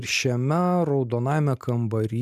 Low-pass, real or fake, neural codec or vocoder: 14.4 kHz; real; none